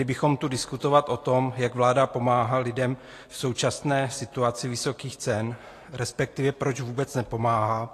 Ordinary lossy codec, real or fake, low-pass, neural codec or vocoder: AAC, 48 kbps; real; 14.4 kHz; none